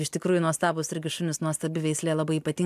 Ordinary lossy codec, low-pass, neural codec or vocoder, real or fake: MP3, 96 kbps; 14.4 kHz; none; real